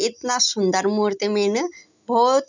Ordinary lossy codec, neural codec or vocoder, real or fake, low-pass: none; none; real; 7.2 kHz